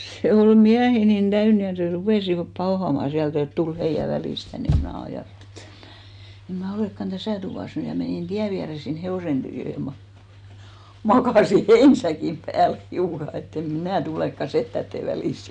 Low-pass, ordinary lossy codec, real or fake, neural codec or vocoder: 9.9 kHz; none; real; none